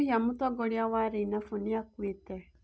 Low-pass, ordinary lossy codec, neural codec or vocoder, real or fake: none; none; none; real